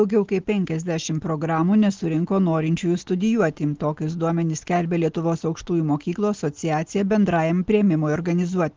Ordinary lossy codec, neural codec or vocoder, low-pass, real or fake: Opus, 16 kbps; none; 7.2 kHz; real